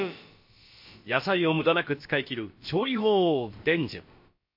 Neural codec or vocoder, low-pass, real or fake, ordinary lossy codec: codec, 16 kHz, about 1 kbps, DyCAST, with the encoder's durations; 5.4 kHz; fake; MP3, 32 kbps